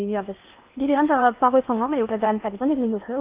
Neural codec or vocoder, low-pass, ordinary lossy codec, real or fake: codec, 16 kHz in and 24 kHz out, 0.8 kbps, FocalCodec, streaming, 65536 codes; 3.6 kHz; Opus, 32 kbps; fake